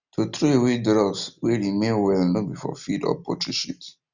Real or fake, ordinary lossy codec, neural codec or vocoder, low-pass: real; none; none; 7.2 kHz